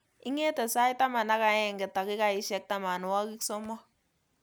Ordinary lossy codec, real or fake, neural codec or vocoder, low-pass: none; real; none; none